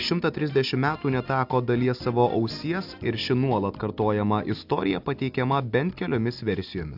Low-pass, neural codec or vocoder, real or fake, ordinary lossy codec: 5.4 kHz; none; real; AAC, 48 kbps